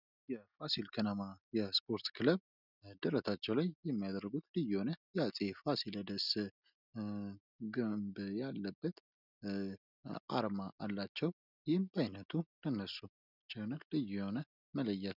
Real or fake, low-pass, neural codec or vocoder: real; 5.4 kHz; none